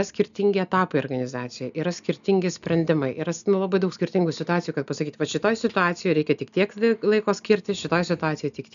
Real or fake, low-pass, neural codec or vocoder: real; 7.2 kHz; none